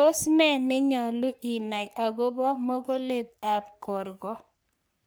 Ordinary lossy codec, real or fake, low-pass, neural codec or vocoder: none; fake; none; codec, 44.1 kHz, 3.4 kbps, Pupu-Codec